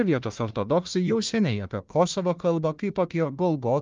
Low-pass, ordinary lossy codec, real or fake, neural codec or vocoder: 7.2 kHz; Opus, 32 kbps; fake; codec, 16 kHz, 1 kbps, FunCodec, trained on Chinese and English, 50 frames a second